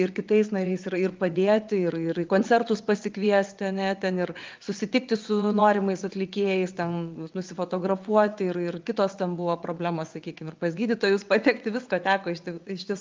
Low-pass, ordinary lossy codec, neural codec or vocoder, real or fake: 7.2 kHz; Opus, 32 kbps; vocoder, 22.05 kHz, 80 mel bands, WaveNeXt; fake